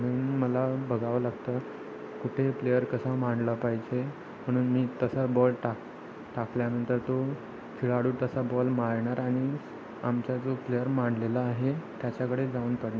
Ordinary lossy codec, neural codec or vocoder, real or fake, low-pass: none; none; real; none